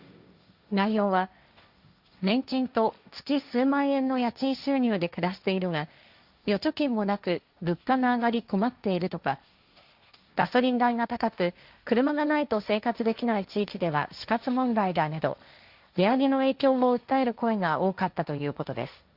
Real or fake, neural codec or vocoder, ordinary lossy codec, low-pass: fake; codec, 16 kHz, 1.1 kbps, Voila-Tokenizer; Opus, 64 kbps; 5.4 kHz